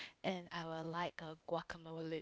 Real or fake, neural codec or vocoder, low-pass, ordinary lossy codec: fake; codec, 16 kHz, 0.8 kbps, ZipCodec; none; none